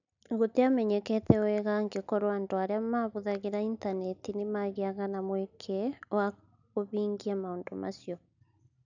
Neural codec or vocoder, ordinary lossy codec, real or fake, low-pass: none; none; real; 7.2 kHz